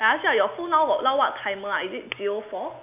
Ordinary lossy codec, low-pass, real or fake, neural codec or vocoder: AAC, 32 kbps; 3.6 kHz; real; none